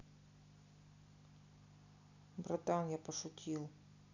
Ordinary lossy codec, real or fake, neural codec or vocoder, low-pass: none; real; none; 7.2 kHz